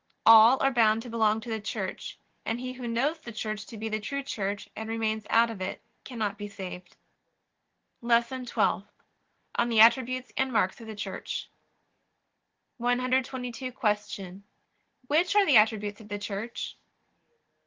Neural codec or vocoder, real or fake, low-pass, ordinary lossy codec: none; real; 7.2 kHz; Opus, 16 kbps